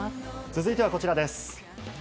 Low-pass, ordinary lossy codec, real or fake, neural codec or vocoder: none; none; real; none